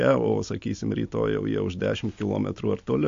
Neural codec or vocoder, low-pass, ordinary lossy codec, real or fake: none; 7.2 kHz; MP3, 48 kbps; real